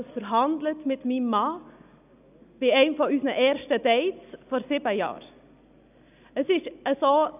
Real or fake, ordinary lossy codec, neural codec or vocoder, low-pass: real; none; none; 3.6 kHz